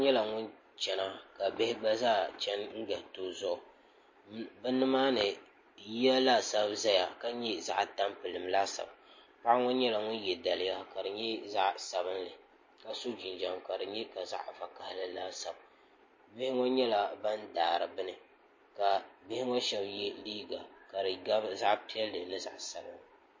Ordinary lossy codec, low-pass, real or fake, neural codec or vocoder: MP3, 32 kbps; 7.2 kHz; real; none